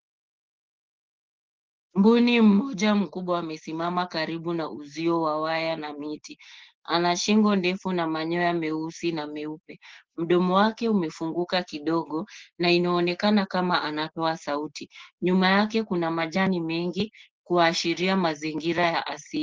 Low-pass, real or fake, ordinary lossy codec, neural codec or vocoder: 7.2 kHz; real; Opus, 16 kbps; none